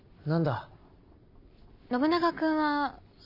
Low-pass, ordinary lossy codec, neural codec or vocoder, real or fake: 5.4 kHz; none; none; real